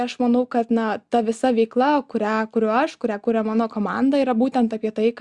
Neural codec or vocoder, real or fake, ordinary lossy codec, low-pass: none; real; Opus, 64 kbps; 10.8 kHz